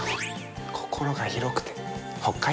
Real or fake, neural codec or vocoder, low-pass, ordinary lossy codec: real; none; none; none